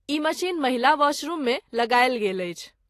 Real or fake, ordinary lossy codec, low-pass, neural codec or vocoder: real; AAC, 48 kbps; 14.4 kHz; none